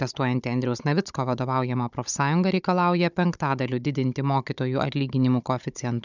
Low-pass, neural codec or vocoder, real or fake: 7.2 kHz; codec, 16 kHz, 16 kbps, FunCodec, trained on Chinese and English, 50 frames a second; fake